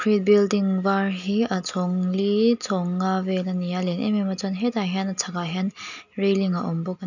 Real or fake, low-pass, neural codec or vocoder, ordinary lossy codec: real; 7.2 kHz; none; none